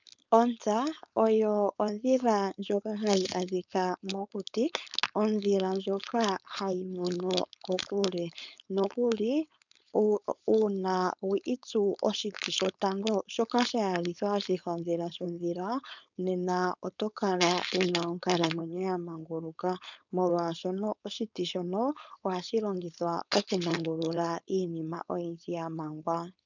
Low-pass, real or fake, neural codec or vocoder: 7.2 kHz; fake; codec, 16 kHz, 4.8 kbps, FACodec